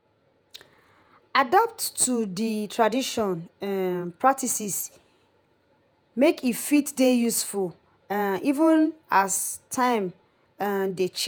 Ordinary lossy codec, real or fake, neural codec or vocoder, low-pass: none; fake; vocoder, 48 kHz, 128 mel bands, Vocos; none